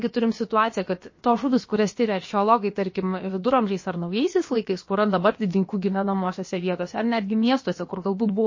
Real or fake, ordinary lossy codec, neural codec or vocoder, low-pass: fake; MP3, 32 kbps; codec, 16 kHz, about 1 kbps, DyCAST, with the encoder's durations; 7.2 kHz